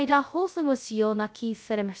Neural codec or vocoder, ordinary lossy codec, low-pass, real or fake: codec, 16 kHz, 0.2 kbps, FocalCodec; none; none; fake